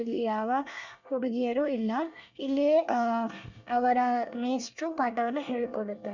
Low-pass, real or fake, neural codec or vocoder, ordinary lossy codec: 7.2 kHz; fake; codec, 24 kHz, 1 kbps, SNAC; none